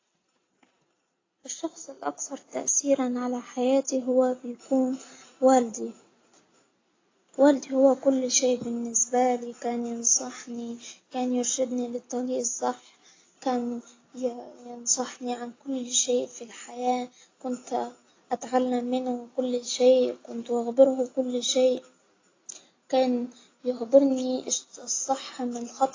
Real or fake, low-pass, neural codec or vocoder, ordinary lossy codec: real; 7.2 kHz; none; AAC, 32 kbps